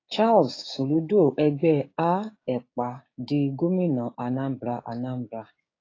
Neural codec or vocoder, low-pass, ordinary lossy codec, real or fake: codec, 16 kHz, 6 kbps, DAC; 7.2 kHz; AAC, 32 kbps; fake